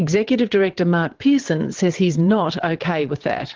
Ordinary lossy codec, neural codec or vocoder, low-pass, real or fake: Opus, 16 kbps; vocoder, 22.05 kHz, 80 mel bands, WaveNeXt; 7.2 kHz; fake